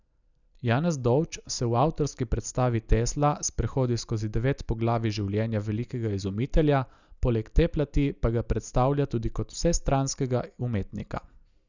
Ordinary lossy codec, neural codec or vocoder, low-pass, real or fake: none; none; 7.2 kHz; real